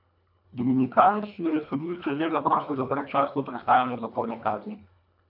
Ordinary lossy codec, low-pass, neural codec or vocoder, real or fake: none; 5.4 kHz; codec, 24 kHz, 1.5 kbps, HILCodec; fake